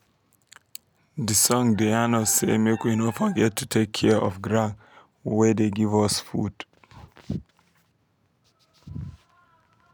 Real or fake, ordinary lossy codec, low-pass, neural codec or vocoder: real; none; none; none